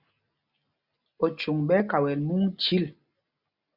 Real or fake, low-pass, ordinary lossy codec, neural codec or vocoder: real; 5.4 kHz; Opus, 64 kbps; none